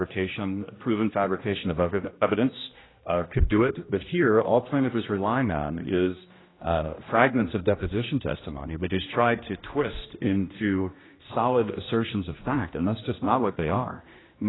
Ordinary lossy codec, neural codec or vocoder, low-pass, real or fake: AAC, 16 kbps; codec, 16 kHz, 1 kbps, X-Codec, HuBERT features, trained on general audio; 7.2 kHz; fake